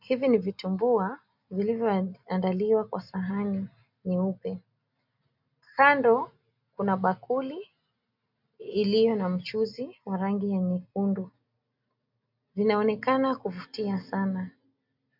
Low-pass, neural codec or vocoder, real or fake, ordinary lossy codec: 5.4 kHz; none; real; MP3, 48 kbps